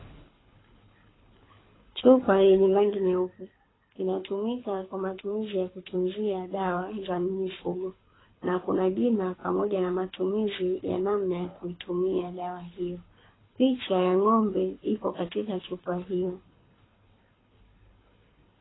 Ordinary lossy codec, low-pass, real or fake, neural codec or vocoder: AAC, 16 kbps; 7.2 kHz; fake; codec, 24 kHz, 6 kbps, HILCodec